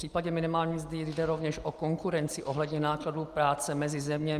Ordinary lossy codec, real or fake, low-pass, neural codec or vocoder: Opus, 32 kbps; real; 14.4 kHz; none